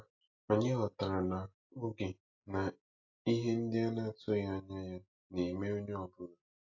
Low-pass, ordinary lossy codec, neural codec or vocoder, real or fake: 7.2 kHz; AAC, 48 kbps; none; real